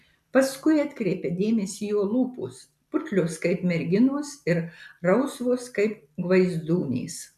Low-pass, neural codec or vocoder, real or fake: 14.4 kHz; none; real